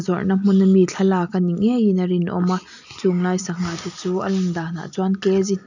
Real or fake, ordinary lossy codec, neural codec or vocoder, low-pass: real; none; none; 7.2 kHz